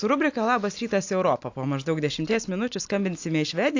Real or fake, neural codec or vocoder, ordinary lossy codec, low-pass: real; none; AAC, 48 kbps; 7.2 kHz